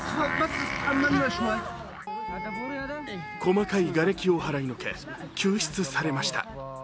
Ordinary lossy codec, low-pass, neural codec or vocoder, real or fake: none; none; none; real